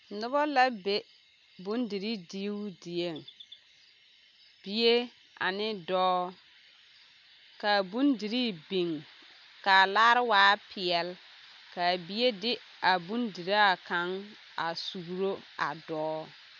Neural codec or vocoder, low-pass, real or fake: none; 7.2 kHz; real